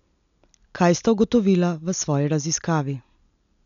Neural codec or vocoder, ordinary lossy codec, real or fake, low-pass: none; none; real; 7.2 kHz